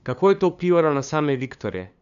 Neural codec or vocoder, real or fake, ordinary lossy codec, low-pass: codec, 16 kHz, 2 kbps, FunCodec, trained on LibriTTS, 25 frames a second; fake; none; 7.2 kHz